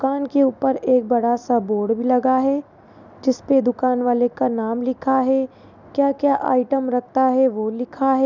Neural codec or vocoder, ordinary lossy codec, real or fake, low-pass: none; none; real; 7.2 kHz